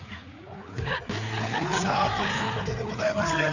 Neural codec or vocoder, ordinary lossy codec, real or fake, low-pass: codec, 16 kHz, 4 kbps, FreqCodec, larger model; none; fake; 7.2 kHz